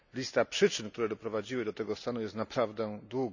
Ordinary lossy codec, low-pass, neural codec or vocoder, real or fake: none; 7.2 kHz; none; real